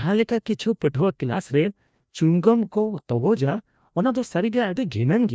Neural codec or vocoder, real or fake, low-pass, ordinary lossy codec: codec, 16 kHz, 1 kbps, FreqCodec, larger model; fake; none; none